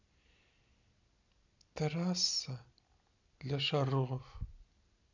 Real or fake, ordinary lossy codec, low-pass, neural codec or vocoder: real; none; 7.2 kHz; none